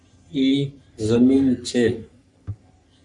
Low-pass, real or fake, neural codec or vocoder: 10.8 kHz; fake; codec, 44.1 kHz, 3.4 kbps, Pupu-Codec